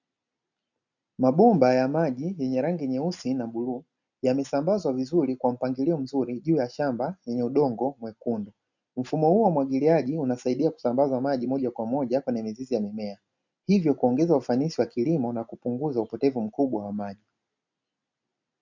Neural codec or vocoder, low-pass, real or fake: none; 7.2 kHz; real